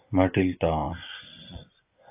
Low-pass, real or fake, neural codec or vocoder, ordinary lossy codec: 3.6 kHz; fake; vocoder, 24 kHz, 100 mel bands, Vocos; AAC, 24 kbps